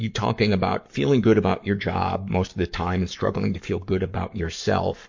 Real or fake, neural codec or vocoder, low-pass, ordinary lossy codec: fake; codec, 44.1 kHz, 7.8 kbps, Pupu-Codec; 7.2 kHz; MP3, 48 kbps